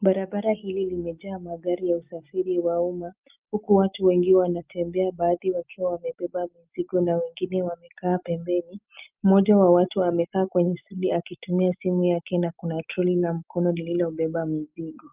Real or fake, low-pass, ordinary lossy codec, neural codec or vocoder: real; 3.6 kHz; Opus, 32 kbps; none